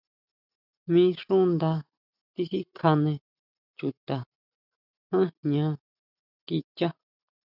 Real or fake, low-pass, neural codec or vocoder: real; 5.4 kHz; none